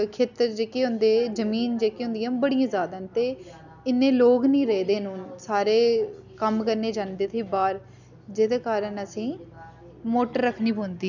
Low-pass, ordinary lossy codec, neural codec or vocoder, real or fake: 7.2 kHz; none; none; real